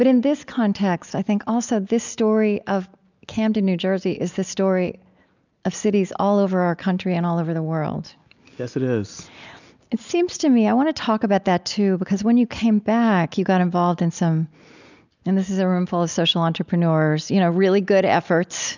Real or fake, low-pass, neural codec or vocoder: real; 7.2 kHz; none